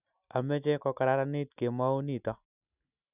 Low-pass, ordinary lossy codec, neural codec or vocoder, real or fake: 3.6 kHz; none; none; real